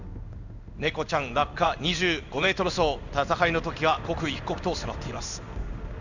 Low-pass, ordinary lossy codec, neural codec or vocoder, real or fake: 7.2 kHz; none; codec, 16 kHz in and 24 kHz out, 1 kbps, XY-Tokenizer; fake